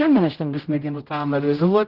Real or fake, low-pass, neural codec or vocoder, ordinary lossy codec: fake; 5.4 kHz; codec, 16 kHz, 0.5 kbps, X-Codec, HuBERT features, trained on general audio; Opus, 16 kbps